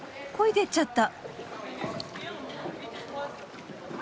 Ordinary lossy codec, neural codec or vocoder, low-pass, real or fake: none; none; none; real